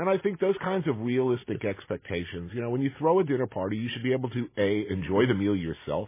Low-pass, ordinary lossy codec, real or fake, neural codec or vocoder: 3.6 kHz; MP3, 16 kbps; real; none